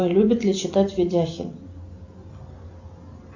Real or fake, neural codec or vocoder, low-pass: real; none; 7.2 kHz